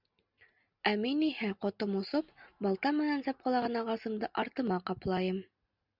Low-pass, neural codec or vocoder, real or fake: 5.4 kHz; none; real